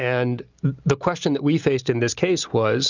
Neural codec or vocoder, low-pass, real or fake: none; 7.2 kHz; real